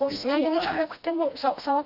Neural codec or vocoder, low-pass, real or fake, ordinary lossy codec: codec, 16 kHz, 1 kbps, FreqCodec, smaller model; 5.4 kHz; fake; AAC, 48 kbps